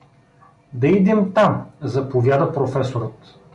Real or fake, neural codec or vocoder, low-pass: real; none; 10.8 kHz